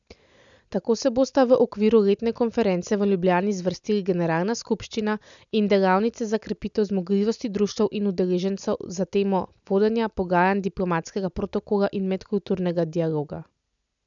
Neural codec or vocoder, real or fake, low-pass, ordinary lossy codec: none; real; 7.2 kHz; none